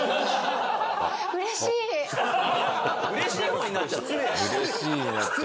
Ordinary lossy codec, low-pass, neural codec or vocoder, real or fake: none; none; none; real